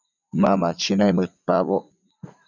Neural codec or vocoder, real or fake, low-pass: vocoder, 44.1 kHz, 80 mel bands, Vocos; fake; 7.2 kHz